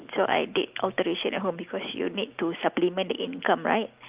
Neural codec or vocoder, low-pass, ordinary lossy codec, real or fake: none; 3.6 kHz; Opus, 24 kbps; real